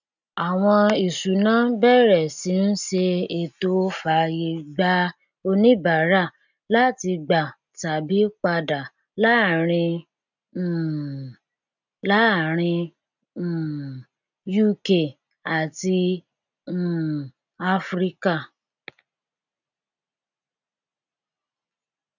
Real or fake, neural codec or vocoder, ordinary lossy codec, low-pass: real; none; none; 7.2 kHz